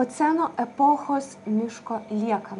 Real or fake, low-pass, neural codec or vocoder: real; 10.8 kHz; none